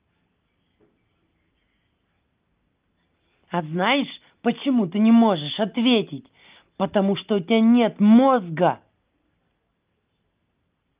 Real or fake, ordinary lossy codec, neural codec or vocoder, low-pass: real; Opus, 32 kbps; none; 3.6 kHz